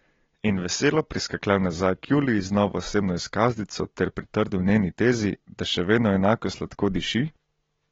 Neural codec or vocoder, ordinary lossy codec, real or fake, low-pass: none; AAC, 24 kbps; real; 7.2 kHz